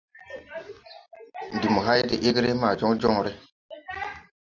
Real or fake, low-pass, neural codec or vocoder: real; 7.2 kHz; none